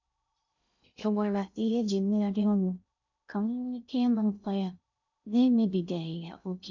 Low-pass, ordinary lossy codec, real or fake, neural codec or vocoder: 7.2 kHz; none; fake; codec, 16 kHz in and 24 kHz out, 0.6 kbps, FocalCodec, streaming, 2048 codes